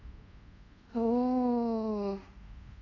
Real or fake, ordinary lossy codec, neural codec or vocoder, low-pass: fake; none; codec, 24 kHz, 0.5 kbps, DualCodec; 7.2 kHz